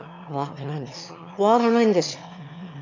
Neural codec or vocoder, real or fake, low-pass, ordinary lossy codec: autoencoder, 22.05 kHz, a latent of 192 numbers a frame, VITS, trained on one speaker; fake; 7.2 kHz; MP3, 48 kbps